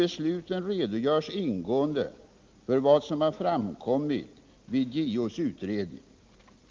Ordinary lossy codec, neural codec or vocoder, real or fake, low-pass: Opus, 32 kbps; none; real; 7.2 kHz